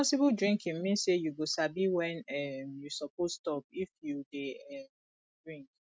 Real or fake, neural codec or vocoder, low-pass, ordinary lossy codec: real; none; none; none